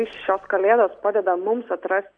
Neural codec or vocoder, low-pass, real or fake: none; 9.9 kHz; real